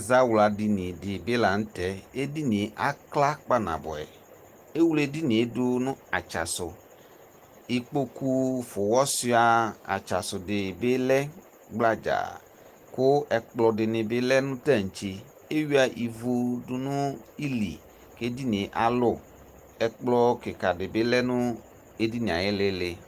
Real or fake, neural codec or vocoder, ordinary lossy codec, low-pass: real; none; Opus, 24 kbps; 14.4 kHz